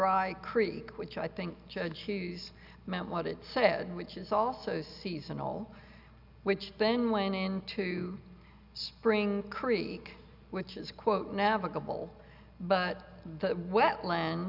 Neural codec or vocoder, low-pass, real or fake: none; 5.4 kHz; real